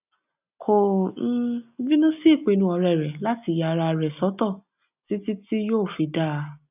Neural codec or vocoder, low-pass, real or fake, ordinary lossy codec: none; 3.6 kHz; real; none